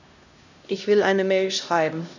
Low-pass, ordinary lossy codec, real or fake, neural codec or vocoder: 7.2 kHz; none; fake; codec, 16 kHz, 1 kbps, X-Codec, HuBERT features, trained on LibriSpeech